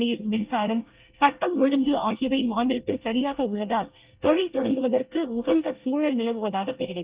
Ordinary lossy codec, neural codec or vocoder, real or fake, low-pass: Opus, 32 kbps; codec, 24 kHz, 1 kbps, SNAC; fake; 3.6 kHz